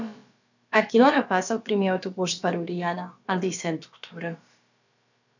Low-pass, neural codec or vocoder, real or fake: 7.2 kHz; codec, 16 kHz, about 1 kbps, DyCAST, with the encoder's durations; fake